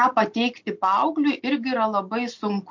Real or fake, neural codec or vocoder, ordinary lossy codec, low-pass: real; none; MP3, 48 kbps; 7.2 kHz